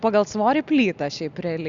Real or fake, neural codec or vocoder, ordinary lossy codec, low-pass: real; none; Opus, 64 kbps; 7.2 kHz